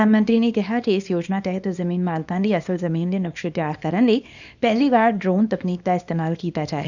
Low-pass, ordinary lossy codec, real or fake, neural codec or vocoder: 7.2 kHz; none; fake; codec, 24 kHz, 0.9 kbps, WavTokenizer, small release